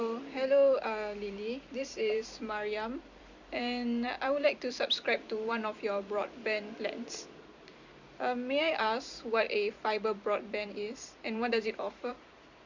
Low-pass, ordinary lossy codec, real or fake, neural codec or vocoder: 7.2 kHz; Opus, 64 kbps; fake; vocoder, 44.1 kHz, 128 mel bands every 256 samples, BigVGAN v2